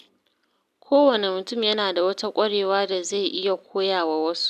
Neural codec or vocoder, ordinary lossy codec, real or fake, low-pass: none; none; real; 14.4 kHz